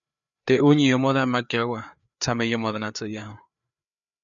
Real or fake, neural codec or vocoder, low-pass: fake; codec, 16 kHz, 4 kbps, FreqCodec, larger model; 7.2 kHz